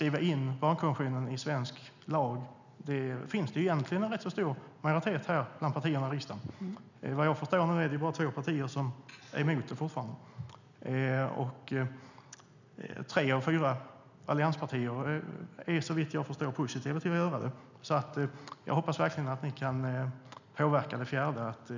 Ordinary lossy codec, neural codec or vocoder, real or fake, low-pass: none; none; real; 7.2 kHz